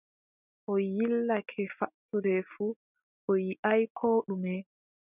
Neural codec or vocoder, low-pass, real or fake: none; 3.6 kHz; real